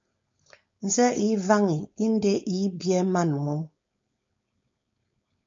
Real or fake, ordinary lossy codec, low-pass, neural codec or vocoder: fake; MP3, 48 kbps; 7.2 kHz; codec, 16 kHz, 4.8 kbps, FACodec